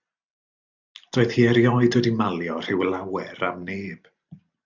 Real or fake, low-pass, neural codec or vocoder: real; 7.2 kHz; none